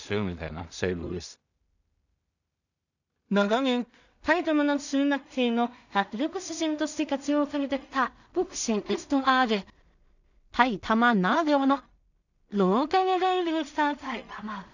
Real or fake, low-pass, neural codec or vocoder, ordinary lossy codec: fake; 7.2 kHz; codec, 16 kHz in and 24 kHz out, 0.4 kbps, LongCat-Audio-Codec, two codebook decoder; none